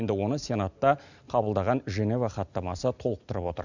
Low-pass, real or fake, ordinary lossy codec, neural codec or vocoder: 7.2 kHz; real; none; none